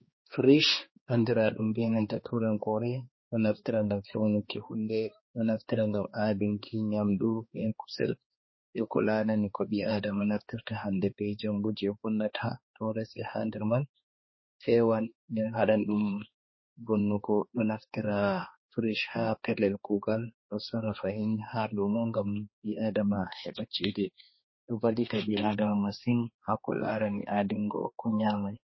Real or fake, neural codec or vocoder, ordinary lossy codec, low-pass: fake; codec, 16 kHz, 2 kbps, X-Codec, HuBERT features, trained on balanced general audio; MP3, 24 kbps; 7.2 kHz